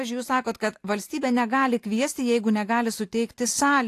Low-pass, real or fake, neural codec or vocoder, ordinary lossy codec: 14.4 kHz; real; none; AAC, 64 kbps